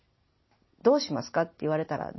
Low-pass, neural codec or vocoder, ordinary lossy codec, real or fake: 7.2 kHz; none; MP3, 24 kbps; real